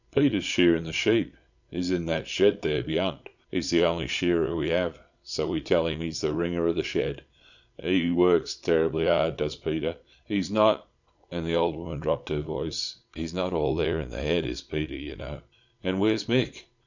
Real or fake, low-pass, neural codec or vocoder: fake; 7.2 kHz; vocoder, 22.05 kHz, 80 mel bands, Vocos